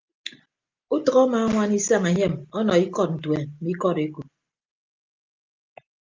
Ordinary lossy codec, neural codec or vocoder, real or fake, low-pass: Opus, 24 kbps; none; real; 7.2 kHz